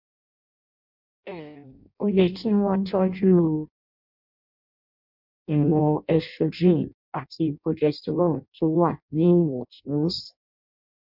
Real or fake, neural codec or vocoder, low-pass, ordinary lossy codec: fake; codec, 16 kHz in and 24 kHz out, 0.6 kbps, FireRedTTS-2 codec; 5.4 kHz; MP3, 48 kbps